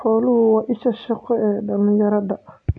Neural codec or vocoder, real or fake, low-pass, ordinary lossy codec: none; real; 9.9 kHz; AAC, 64 kbps